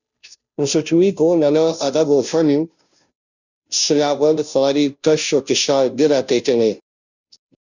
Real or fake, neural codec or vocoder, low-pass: fake; codec, 16 kHz, 0.5 kbps, FunCodec, trained on Chinese and English, 25 frames a second; 7.2 kHz